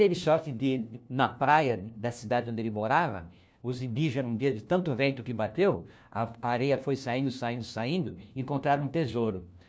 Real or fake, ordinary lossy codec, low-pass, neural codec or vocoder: fake; none; none; codec, 16 kHz, 1 kbps, FunCodec, trained on LibriTTS, 50 frames a second